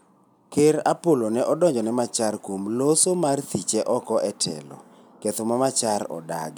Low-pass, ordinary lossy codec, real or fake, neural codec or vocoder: none; none; real; none